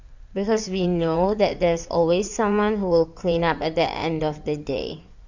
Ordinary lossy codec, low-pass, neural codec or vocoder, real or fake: none; 7.2 kHz; codec, 16 kHz in and 24 kHz out, 2.2 kbps, FireRedTTS-2 codec; fake